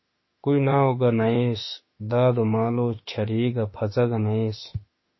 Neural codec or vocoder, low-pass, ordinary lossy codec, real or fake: autoencoder, 48 kHz, 32 numbers a frame, DAC-VAE, trained on Japanese speech; 7.2 kHz; MP3, 24 kbps; fake